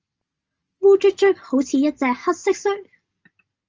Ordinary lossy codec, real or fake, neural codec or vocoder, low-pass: Opus, 24 kbps; real; none; 7.2 kHz